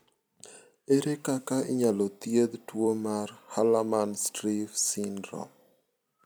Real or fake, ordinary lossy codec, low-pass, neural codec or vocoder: fake; none; none; vocoder, 44.1 kHz, 128 mel bands every 256 samples, BigVGAN v2